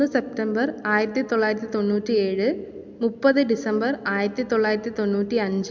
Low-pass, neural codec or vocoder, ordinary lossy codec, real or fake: 7.2 kHz; none; MP3, 64 kbps; real